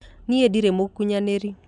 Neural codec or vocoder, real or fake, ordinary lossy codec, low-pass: none; real; none; 10.8 kHz